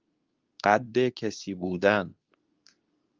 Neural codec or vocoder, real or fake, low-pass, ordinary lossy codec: codec, 24 kHz, 0.9 kbps, WavTokenizer, medium speech release version 2; fake; 7.2 kHz; Opus, 24 kbps